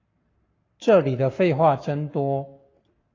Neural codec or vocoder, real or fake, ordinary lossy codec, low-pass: codec, 44.1 kHz, 7.8 kbps, Pupu-Codec; fake; AAC, 32 kbps; 7.2 kHz